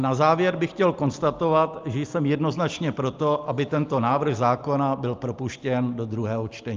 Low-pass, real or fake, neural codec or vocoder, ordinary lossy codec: 7.2 kHz; real; none; Opus, 24 kbps